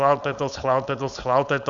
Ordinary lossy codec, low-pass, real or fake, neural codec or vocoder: Opus, 64 kbps; 7.2 kHz; fake; codec, 16 kHz, 4.8 kbps, FACodec